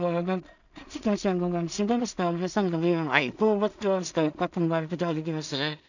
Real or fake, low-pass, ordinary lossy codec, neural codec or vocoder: fake; 7.2 kHz; none; codec, 16 kHz in and 24 kHz out, 0.4 kbps, LongCat-Audio-Codec, two codebook decoder